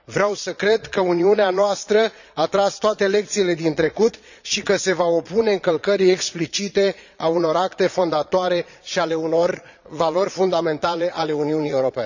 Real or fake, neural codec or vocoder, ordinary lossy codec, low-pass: fake; vocoder, 22.05 kHz, 80 mel bands, Vocos; none; 7.2 kHz